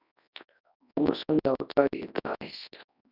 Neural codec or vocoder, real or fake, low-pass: codec, 24 kHz, 0.9 kbps, WavTokenizer, large speech release; fake; 5.4 kHz